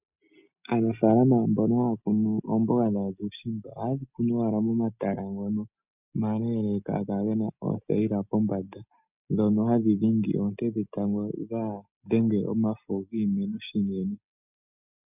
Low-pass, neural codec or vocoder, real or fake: 3.6 kHz; none; real